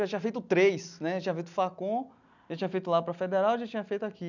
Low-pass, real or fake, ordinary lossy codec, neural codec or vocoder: 7.2 kHz; real; none; none